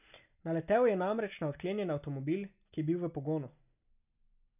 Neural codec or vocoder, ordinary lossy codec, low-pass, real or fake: none; none; 3.6 kHz; real